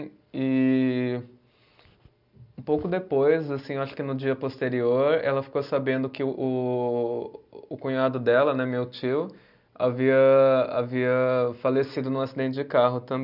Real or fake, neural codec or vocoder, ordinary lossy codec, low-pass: real; none; none; 5.4 kHz